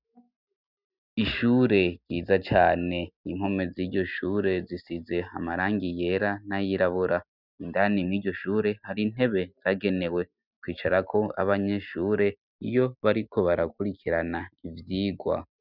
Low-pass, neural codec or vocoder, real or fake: 5.4 kHz; none; real